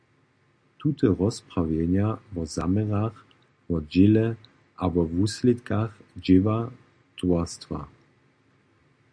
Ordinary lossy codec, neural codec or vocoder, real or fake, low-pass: MP3, 96 kbps; none; real; 9.9 kHz